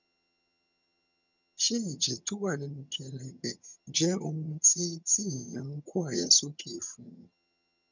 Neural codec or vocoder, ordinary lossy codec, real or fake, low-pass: vocoder, 22.05 kHz, 80 mel bands, HiFi-GAN; none; fake; 7.2 kHz